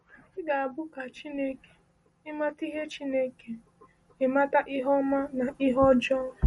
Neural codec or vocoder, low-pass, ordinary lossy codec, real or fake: none; 19.8 kHz; MP3, 48 kbps; real